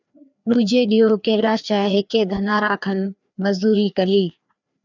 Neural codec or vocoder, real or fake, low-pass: codec, 16 kHz, 2 kbps, FreqCodec, larger model; fake; 7.2 kHz